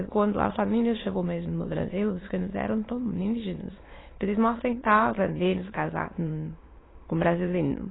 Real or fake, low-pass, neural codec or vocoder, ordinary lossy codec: fake; 7.2 kHz; autoencoder, 22.05 kHz, a latent of 192 numbers a frame, VITS, trained on many speakers; AAC, 16 kbps